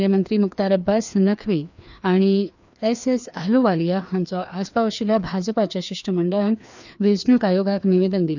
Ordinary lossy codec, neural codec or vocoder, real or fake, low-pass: none; codec, 16 kHz, 2 kbps, FreqCodec, larger model; fake; 7.2 kHz